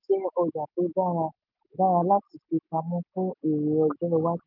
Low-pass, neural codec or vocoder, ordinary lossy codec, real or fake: 5.4 kHz; none; none; real